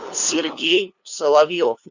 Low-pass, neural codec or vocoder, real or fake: 7.2 kHz; codec, 16 kHz, 2 kbps, FunCodec, trained on LibriTTS, 25 frames a second; fake